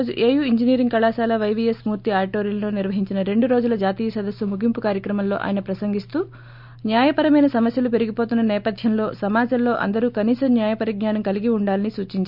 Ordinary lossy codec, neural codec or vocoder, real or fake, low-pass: none; none; real; 5.4 kHz